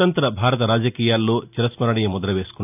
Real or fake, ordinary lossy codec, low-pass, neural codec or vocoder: real; none; 3.6 kHz; none